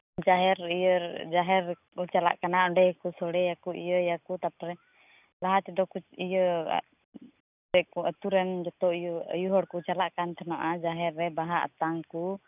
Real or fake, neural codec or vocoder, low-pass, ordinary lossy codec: real; none; 3.6 kHz; none